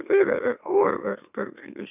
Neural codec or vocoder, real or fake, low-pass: autoencoder, 44.1 kHz, a latent of 192 numbers a frame, MeloTTS; fake; 3.6 kHz